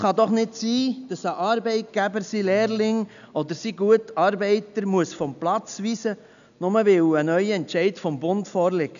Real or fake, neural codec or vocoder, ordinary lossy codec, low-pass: real; none; none; 7.2 kHz